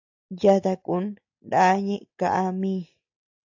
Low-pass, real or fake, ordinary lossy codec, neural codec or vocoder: 7.2 kHz; real; AAC, 48 kbps; none